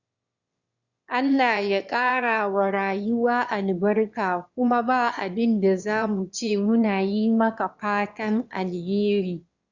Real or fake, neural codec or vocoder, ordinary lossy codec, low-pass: fake; autoencoder, 22.05 kHz, a latent of 192 numbers a frame, VITS, trained on one speaker; Opus, 64 kbps; 7.2 kHz